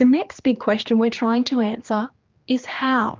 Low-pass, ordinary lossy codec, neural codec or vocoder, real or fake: 7.2 kHz; Opus, 32 kbps; codec, 16 kHz, 2 kbps, X-Codec, HuBERT features, trained on general audio; fake